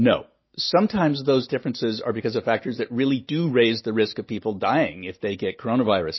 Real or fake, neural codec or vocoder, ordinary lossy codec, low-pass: real; none; MP3, 24 kbps; 7.2 kHz